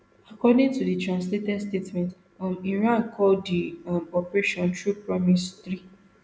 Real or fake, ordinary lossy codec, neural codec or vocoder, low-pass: real; none; none; none